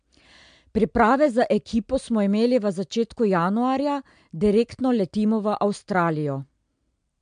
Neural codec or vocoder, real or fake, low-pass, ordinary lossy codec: none; real; 9.9 kHz; MP3, 64 kbps